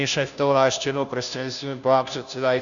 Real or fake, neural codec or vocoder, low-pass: fake; codec, 16 kHz, 0.5 kbps, FunCodec, trained on Chinese and English, 25 frames a second; 7.2 kHz